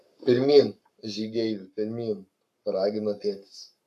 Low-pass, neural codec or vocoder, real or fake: 14.4 kHz; codec, 44.1 kHz, 7.8 kbps, Pupu-Codec; fake